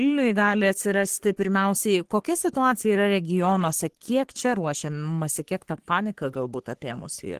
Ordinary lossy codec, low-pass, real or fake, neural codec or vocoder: Opus, 16 kbps; 14.4 kHz; fake; codec, 44.1 kHz, 3.4 kbps, Pupu-Codec